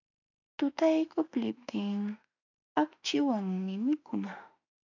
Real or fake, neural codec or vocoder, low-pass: fake; autoencoder, 48 kHz, 32 numbers a frame, DAC-VAE, trained on Japanese speech; 7.2 kHz